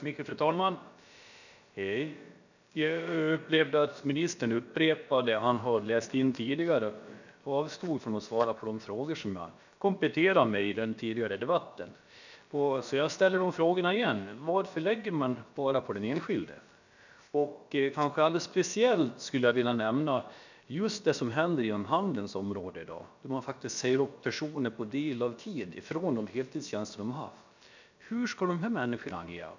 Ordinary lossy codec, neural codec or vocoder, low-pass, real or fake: none; codec, 16 kHz, about 1 kbps, DyCAST, with the encoder's durations; 7.2 kHz; fake